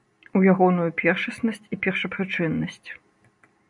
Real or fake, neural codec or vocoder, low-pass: real; none; 10.8 kHz